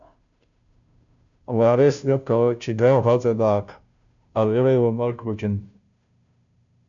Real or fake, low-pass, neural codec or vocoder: fake; 7.2 kHz; codec, 16 kHz, 0.5 kbps, FunCodec, trained on Chinese and English, 25 frames a second